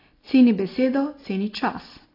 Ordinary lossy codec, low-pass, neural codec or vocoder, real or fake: AAC, 24 kbps; 5.4 kHz; none; real